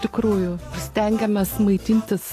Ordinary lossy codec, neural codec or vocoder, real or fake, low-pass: AAC, 48 kbps; none; real; 14.4 kHz